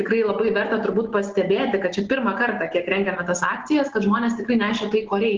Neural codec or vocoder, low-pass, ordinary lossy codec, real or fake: none; 7.2 kHz; Opus, 16 kbps; real